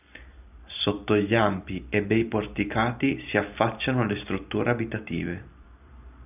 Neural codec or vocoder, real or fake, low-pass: vocoder, 44.1 kHz, 128 mel bands every 512 samples, BigVGAN v2; fake; 3.6 kHz